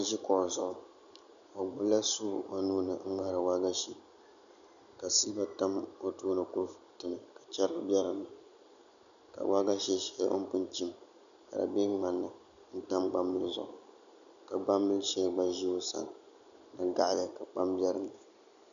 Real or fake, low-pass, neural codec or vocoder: real; 7.2 kHz; none